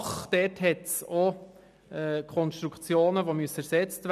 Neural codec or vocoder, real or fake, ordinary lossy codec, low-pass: none; real; none; 14.4 kHz